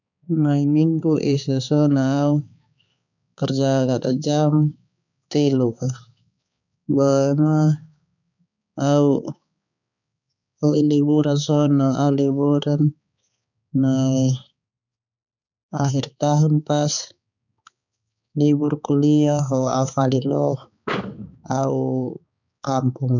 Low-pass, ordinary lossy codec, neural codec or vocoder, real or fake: 7.2 kHz; none; codec, 16 kHz, 4 kbps, X-Codec, HuBERT features, trained on balanced general audio; fake